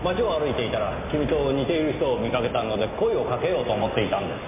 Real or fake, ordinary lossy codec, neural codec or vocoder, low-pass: real; MP3, 24 kbps; none; 3.6 kHz